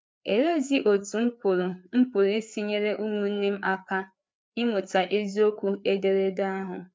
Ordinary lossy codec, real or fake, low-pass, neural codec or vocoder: none; fake; none; codec, 16 kHz, 4 kbps, FreqCodec, larger model